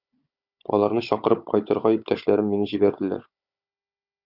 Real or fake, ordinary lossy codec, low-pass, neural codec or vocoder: fake; Opus, 64 kbps; 5.4 kHz; codec, 16 kHz, 16 kbps, FunCodec, trained on Chinese and English, 50 frames a second